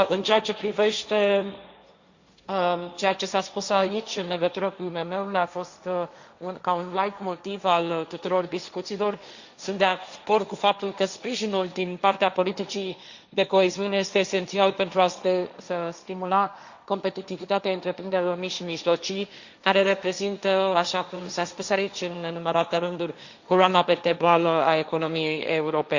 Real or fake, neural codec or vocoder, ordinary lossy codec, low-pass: fake; codec, 16 kHz, 1.1 kbps, Voila-Tokenizer; Opus, 64 kbps; 7.2 kHz